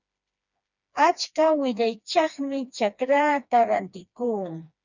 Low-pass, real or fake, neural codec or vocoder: 7.2 kHz; fake; codec, 16 kHz, 2 kbps, FreqCodec, smaller model